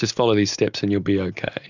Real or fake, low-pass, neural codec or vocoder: real; 7.2 kHz; none